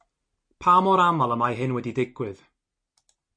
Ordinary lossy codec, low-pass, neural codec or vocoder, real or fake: MP3, 48 kbps; 9.9 kHz; none; real